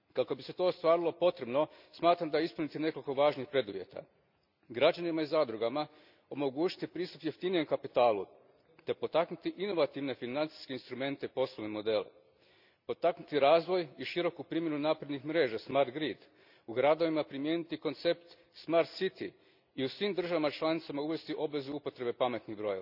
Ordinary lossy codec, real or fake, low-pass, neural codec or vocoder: none; real; 5.4 kHz; none